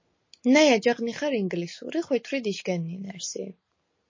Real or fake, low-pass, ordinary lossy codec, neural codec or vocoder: real; 7.2 kHz; MP3, 32 kbps; none